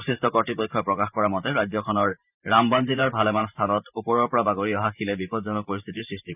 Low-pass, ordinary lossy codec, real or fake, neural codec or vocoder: 3.6 kHz; none; fake; vocoder, 44.1 kHz, 128 mel bands every 512 samples, BigVGAN v2